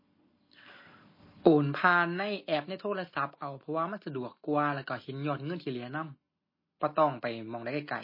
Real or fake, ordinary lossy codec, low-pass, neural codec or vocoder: real; MP3, 24 kbps; 5.4 kHz; none